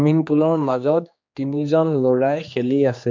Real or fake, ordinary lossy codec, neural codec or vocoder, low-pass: fake; MP3, 48 kbps; codec, 16 kHz, 2 kbps, X-Codec, HuBERT features, trained on general audio; 7.2 kHz